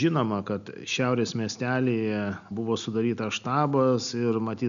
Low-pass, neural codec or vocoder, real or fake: 7.2 kHz; none; real